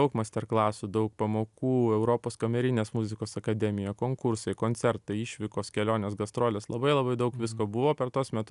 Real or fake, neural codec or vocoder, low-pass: real; none; 10.8 kHz